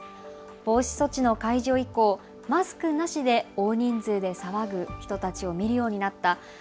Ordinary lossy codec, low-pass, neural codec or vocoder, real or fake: none; none; none; real